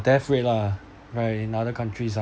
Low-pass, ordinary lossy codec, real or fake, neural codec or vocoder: none; none; real; none